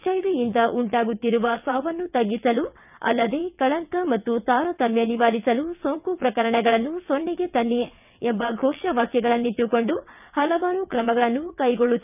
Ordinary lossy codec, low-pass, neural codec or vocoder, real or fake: none; 3.6 kHz; vocoder, 22.05 kHz, 80 mel bands, WaveNeXt; fake